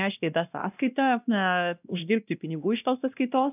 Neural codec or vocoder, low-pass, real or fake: codec, 16 kHz, 1 kbps, X-Codec, WavLM features, trained on Multilingual LibriSpeech; 3.6 kHz; fake